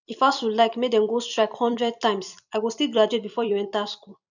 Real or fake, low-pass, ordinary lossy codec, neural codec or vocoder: fake; 7.2 kHz; none; vocoder, 44.1 kHz, 128 mel bands every 256 samples, BigVGAN v2